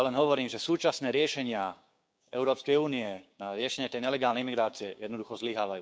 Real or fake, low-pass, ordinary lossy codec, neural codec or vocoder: fake; none; none; codec, 16 kHz, 6 kbps, DAC